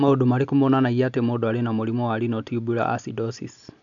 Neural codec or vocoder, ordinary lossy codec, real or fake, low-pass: none; none; real; 7.2 kHz